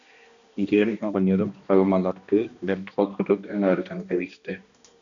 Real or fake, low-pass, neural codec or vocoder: fake; 7.2 kHz; codec, 16 kHz, 1 kbps, X-Codec, HuBERT features, trained on balanced general audio